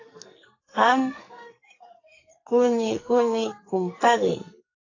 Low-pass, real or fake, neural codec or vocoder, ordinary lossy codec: 7.2 kHz; fake; codec, 44.1 kHz, 2.6 kbps, SNAC; AAC, 32 kbps